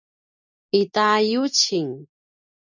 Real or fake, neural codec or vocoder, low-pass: real; none; 7.2 kHz